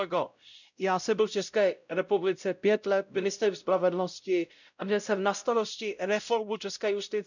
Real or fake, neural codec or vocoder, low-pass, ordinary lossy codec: fake; codec, 16 kHz, 0.5 kbps, X-Codec, WavLM features, trained on Multilingual LibriSpeech; 7.2 kHz; none